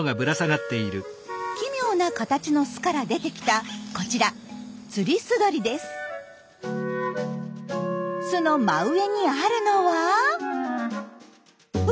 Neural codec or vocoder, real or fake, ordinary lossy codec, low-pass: none; real; none; none